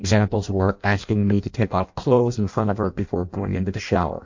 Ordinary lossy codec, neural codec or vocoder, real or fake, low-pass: MP3, 48 kbps; codec, 16 kHz in and 24 kHz out, 0.6 kbps, FireRedTTS-2 codec; fake; 7.2 kHz